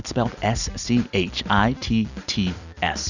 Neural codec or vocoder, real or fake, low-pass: vocoder, 44.1 kHz, 128 mel bands every 256 samples, BigVGAN v2; fake; 7.2 kHz